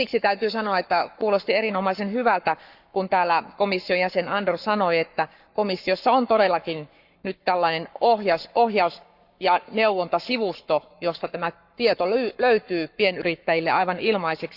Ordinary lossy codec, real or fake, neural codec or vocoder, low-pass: Opus, 64 kbps; fake; codec, 44.1 kHz, 7.8 kbps, Pupu-Codec; 5.4 kHz